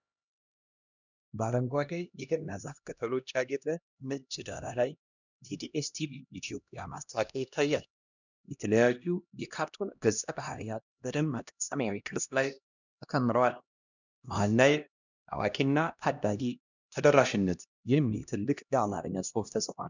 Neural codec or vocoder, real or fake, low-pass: codec, 16 kHz, 1 kbps, X-Codec, HuBERT features, trained on LibriSpeech; fake; 7.2 kHz